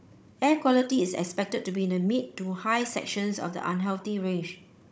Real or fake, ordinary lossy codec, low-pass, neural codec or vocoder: fake; none; none; codec, 16 kHz, 16 kbps, FunCodec, trained on Chinese and English, 50 frames a second